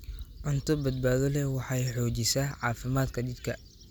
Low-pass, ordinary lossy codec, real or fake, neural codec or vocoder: none; none; real; none